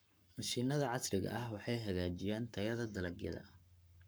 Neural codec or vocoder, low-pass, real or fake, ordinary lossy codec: codec, 44.1 kHz, 7.8 kbps, Pupu-Codec; none; fake; none